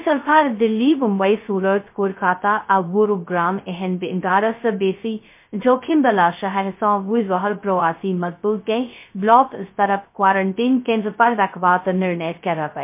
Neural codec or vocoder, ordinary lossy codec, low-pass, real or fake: codec, 16 kHz, 0.2 kbps, FocalCodec; MP3, 24 kbps; 3.6 kHz; fake